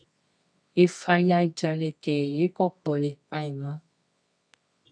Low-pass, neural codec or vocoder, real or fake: 9.9 kHz; codec, 24 kHz, 0.9 kbps, WavTokenizer, medium music audio release; fake